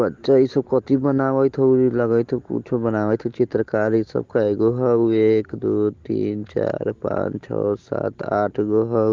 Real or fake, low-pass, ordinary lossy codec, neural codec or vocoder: real; 7.2 kHz; Opus, 16 kbps; none